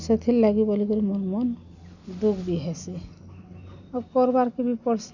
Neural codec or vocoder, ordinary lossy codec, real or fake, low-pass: none; none; real; 7.2 kHz